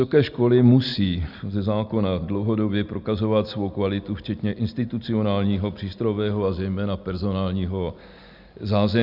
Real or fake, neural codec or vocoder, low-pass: real; none; 5.4 kHz